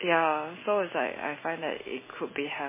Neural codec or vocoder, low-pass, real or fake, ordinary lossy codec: none; 3.6 kHz; real; MP3, 16 kbps